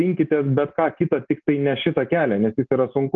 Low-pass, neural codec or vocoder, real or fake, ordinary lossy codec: 7.2 kHz; none; real; Opus, 24 kbps